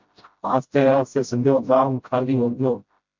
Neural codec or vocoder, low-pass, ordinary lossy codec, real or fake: codec, 16 kHz, 0.5 kbps, FreqCodec, smaller model; 7.2 kHz; MP3, 48 kbps; fake